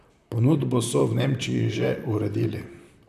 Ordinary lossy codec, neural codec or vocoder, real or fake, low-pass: none; vocoder, 44.1 kHz, 128 mel bands, Pupu-Vocoder; fake; 14.4 kHz